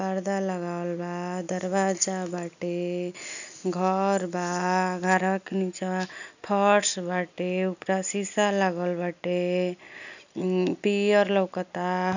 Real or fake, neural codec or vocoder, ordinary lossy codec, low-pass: real; none; none; 7.2 kHz